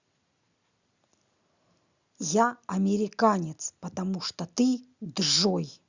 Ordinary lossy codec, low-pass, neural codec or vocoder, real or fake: Opus, 64 kbps; 7.2 kHz; none; real